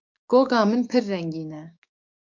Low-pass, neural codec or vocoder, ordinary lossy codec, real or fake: 7.2 kHz; codec, 16 kHz, 6 kbps, DAC; MP3, 48 kbps; fake